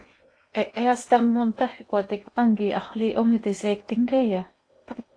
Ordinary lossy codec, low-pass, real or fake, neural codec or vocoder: AAC, 48 kbps; 9.9 kHz; fake; codec, 16 kHz in and 24 kHz out, 0.8 kbps, FocalCodec, streaming, 65536 codes